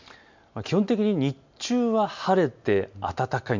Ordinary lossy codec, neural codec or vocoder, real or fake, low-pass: MP3, 64 kbps; none; real; 7.2 kHz